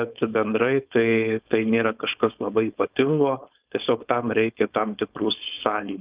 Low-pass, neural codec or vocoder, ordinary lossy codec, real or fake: 3.6 kHz; codec, 16 kHz, 4.8 kbps, FACodec; Opus, 24 kbps; fake